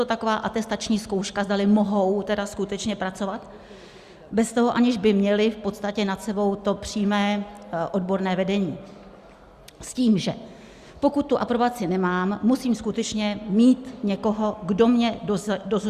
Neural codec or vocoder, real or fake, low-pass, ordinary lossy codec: none; real; 14.4 kHz; Opus, 64 kbps